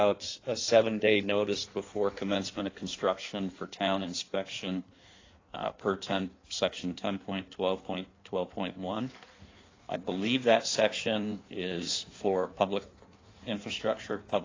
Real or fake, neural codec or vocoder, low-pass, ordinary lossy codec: fake; codec, 16 kHz in and 24 kHz out, 1.1 kbps, FireRedTTS-2 codec; 7.2 kHz; AAC, 32 kbps